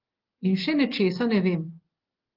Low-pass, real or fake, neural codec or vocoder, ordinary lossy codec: 5.4 kHz; real; none; Opus, 16 kbps